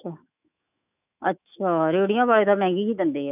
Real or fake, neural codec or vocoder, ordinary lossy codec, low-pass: fake; autoencoder, 48 kHz, 128 numbers a frame, DAC-VAE, trained on Japanese speech; none; 3.6 kHz